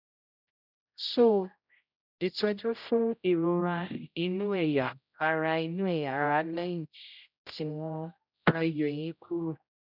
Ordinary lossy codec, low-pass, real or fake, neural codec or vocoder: none; 5.4 kHz; fake; codec, 16 kHz, 0.5 kbps, X-Codec, HuBERT features, trained on general audio